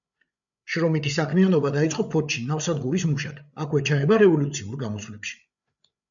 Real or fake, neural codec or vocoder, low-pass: fake; codec, 16 kHz, 8 kbps, FreqCodec, larger model; 7.2 kHz